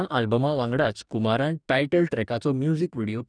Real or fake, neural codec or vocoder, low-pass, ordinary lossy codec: fake; codec, 44.1 kHz, 2.6 kbps, DAC; 9.9 kHz; none